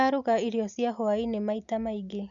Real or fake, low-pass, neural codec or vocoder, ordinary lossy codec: real; 7.2 kHz; none; MP3, 96 kbps